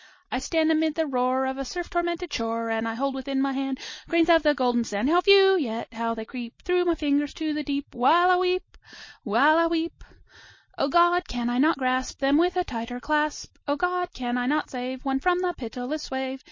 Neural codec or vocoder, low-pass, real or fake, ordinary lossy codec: none; 7.2 kHz; real; MP3, 32 kbps